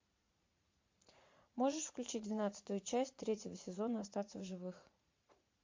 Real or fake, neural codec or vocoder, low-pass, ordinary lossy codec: fake; vocoder, 44.1 kHz, 80 mel bands, Vocos; 7.2 kHz; MP3, 48 kbps